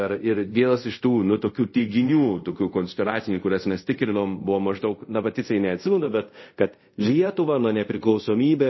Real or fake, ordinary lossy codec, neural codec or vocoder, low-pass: fake; MP3, 24 kbps; codec, 24 kHz, 0.5 kbps, DualCodec; 7.2 kHz